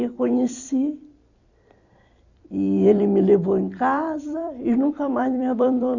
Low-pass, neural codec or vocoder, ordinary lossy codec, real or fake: 7.2 kHz; vocoder, 44.1 kHz, 128 mel bands every 256 samples, BigVGAN v2; Opus, 64 kbps; fake